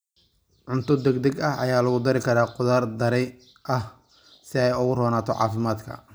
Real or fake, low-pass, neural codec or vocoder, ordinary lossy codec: real; none; none; none